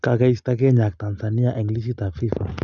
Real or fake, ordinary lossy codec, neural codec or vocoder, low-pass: real; none; none; 7.2 kHz